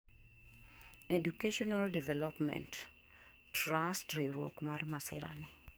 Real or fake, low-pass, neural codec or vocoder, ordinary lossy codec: fake; none; codec, 44.1 kHz, 2.6 kbps, SNAC; none